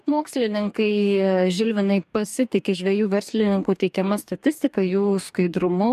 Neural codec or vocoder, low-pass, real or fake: codec, 44.1 kHz, 2.6 kbps, DAC; 14.4 kHz; fake